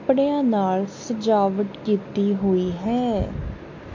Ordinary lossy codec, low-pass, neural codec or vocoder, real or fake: MP3, 48 kbps; 7.2 kHz; none; real